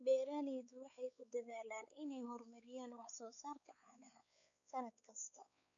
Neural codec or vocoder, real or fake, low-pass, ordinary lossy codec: codec, 16 kHz, 4 kbps, X-Codec, WavLM features, trained on Multilingual LibriSpeech; fake; 7.2 kHz; none